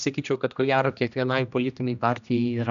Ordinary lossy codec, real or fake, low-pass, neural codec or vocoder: MP3, 96 kbps; fake; 7.2 kHz; codec, 16 kHz, 1 kbps, X-Codec, HuBERT features, trained on general audio